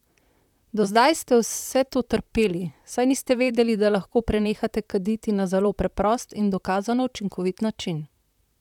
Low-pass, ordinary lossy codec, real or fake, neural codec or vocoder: 19.8 kHz; none; fake; vocoder, 44.1 kHz, 128 mel bands, Pupu-Vocoder